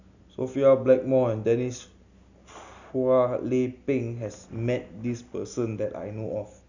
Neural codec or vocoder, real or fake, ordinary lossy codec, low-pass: none; real; none; 7.2 kHz